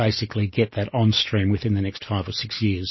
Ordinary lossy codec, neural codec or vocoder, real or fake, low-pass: MP3, 24 kbps; none; real; 7.2 kHz